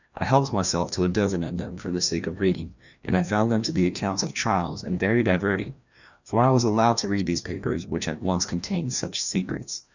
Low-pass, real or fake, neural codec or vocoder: 7.2 kHz; fake; codec, 16 kHz, 1 kbps, FreqCodec, larger model